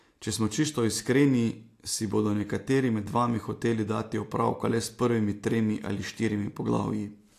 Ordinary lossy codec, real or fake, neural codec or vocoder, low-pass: AAC, 64 kbps; real; none; 14.4 kHz